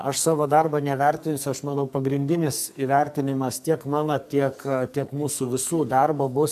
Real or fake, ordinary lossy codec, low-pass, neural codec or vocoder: fake; AAC, 96 kbps; 14.4 kHz; codec, 44.1 kHz, 2.6 kbps, SNAC